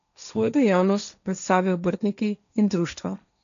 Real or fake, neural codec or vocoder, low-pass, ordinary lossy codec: fake; codec, 16 kHz, 1.1 kbps, Voila-Tokenizer; 7.2 kHz; none